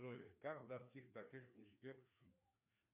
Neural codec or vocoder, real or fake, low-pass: codec, 16 kHz, 2 kbps, FreqCodec, larger model; fake; 3.6 kHz